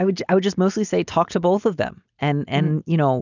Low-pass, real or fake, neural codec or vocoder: 7.2 kHz; real; none